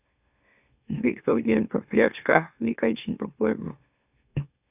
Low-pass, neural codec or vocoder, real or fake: 3.6 kHz; autoencoder, 44.1 kHz, a latent of 192 numbers a frame, MeloTTS; fake